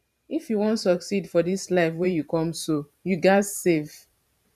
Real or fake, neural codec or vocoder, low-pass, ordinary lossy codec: fake; vocoder, 44.1 kHz, 128 mel bands every 512 samples, BigVGAN v2; 14.4 kHz; none